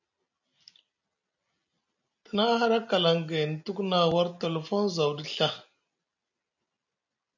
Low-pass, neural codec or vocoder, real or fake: 7.2 kHz; none; real